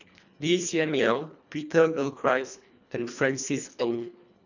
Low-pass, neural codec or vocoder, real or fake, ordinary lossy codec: 7.2 kHz; codec, 24 kHz, 1.5 kbps, HILCodec; fake; none